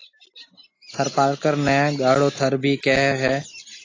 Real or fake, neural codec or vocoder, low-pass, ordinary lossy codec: real; none; 7.2 kHz; AAC, 32 kbps